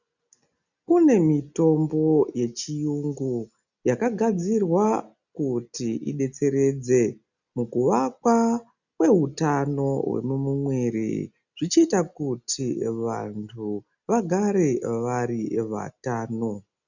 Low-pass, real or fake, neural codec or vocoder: 7.2 kHz; real; none